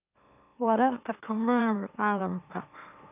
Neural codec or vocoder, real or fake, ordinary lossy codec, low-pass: autoencoder, 44.1 kHz, a latent of 192 numbers a frame, MeloTTS; fake; none; 3.6 kHz